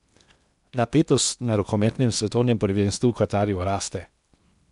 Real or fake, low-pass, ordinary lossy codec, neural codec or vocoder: fake; 10.8 kHz; none; codec, 16 kHz in and 24 kHz out, 0.8 kbps, FocalCodec, streaming, 65536 codes